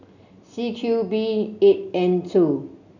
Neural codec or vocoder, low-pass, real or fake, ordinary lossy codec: none; 7.2 kHz; real; none